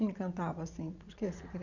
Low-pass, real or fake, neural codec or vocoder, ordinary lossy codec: 7.2 kHz; fake; vocoder, 44.1 kHz, 80 mel bands, Vocos; none